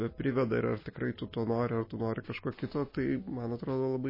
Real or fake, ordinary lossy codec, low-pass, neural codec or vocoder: real; MP3, 24 kbps; 5.4 kHz; none